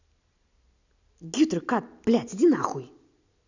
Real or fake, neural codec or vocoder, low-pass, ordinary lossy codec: real; none; 7.2 kHz; none